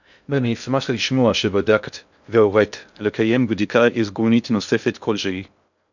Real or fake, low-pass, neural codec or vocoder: fake; 7.2 kHz; codec, 16 kHz in and 24 kHz out, 0.6 kbps, FocalCodec, streaming, 2048 codes